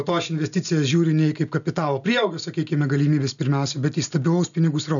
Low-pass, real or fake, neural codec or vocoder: 7.2 kHz; real; none